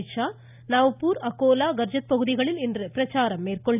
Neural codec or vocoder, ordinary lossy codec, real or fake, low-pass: none; none; real; 3.6 kHz